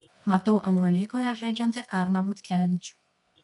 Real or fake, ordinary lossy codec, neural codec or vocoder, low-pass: fake; MP3, 96 kbps; codec, 24 kHz, 0.9 kbps, WavTokenizer, medium music audio release; 10.8 kHz